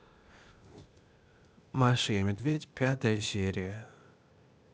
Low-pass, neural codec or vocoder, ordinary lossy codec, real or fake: none; codec, 16 kHz, 0.8 kbps, ZipCodec; none; fake